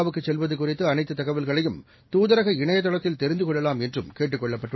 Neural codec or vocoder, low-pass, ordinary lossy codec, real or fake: none; 7.2 kHz; MP3, 24 kbps; real